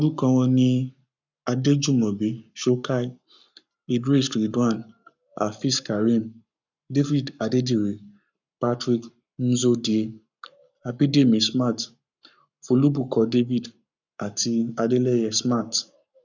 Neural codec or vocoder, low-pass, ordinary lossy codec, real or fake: codec, 44.1 kHz, 7.8 kbps, Pupu-Codec; 7.2 kHz; none; fake